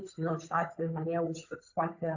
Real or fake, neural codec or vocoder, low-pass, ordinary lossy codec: fake; codec, 16 kHz, 8 kbps, FunCodec, trained on Chinese and English, 25 frames a second; 7.2 kHz; AAC, 48 kbps